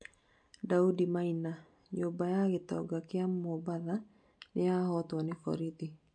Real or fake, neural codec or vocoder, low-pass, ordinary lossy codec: real; none; 9.9 kHz; MP3, 64 kbps